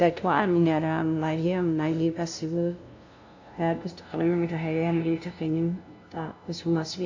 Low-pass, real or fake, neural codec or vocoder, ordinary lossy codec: 7.2 kHz; fake; codec, 16 kHz, 0.5 kbps, FunCodec, trained on LibriTTS, 25 frames a second; AAC, 48 kbps